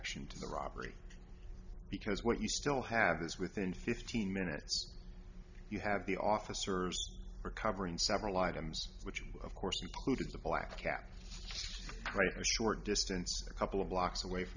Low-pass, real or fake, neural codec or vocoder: 7.2 kHz; real; none